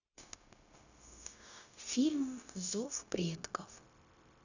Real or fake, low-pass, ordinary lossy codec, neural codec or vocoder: fake; 7.2 kHz; none; codec, 16 kHz, 0.9 kbps, LongCat-Audio-Codec